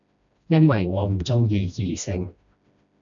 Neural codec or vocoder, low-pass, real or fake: codec, 16 kHz, 1 kbps, FreqCodec, smaller model; 7.2 kHz; fake